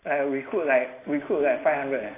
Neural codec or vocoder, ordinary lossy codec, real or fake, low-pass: none; AAC, 24 kbps; real; 3.6 kHz